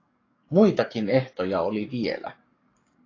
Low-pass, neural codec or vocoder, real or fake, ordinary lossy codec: 7.2 kHz; codec, 44.1 kHz, 7.8 kbps, DAC; fake; AAC, 32 kbps